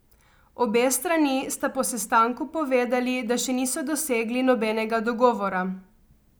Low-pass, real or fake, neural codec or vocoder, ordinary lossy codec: none; real; none; none